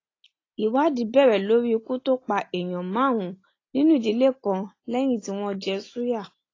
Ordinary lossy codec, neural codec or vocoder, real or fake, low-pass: AAC, 32 kbps; none; real; 7.2 kHz